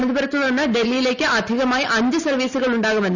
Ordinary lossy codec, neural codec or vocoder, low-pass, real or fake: none; none; 7.2 kHz; real